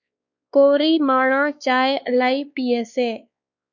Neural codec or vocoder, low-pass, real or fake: codec, 16 kHz, 2 kbps, X-Codec, WavLM features, trained on Multilingual LibriSpeech; 7.2 kHz; fake